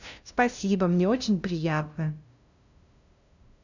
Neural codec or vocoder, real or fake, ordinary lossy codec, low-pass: codec, 16 kHz, 0.5 kbps, FunCodec, trained on LibriTTS, 25 frames a second; fake; none; 7.2 kHz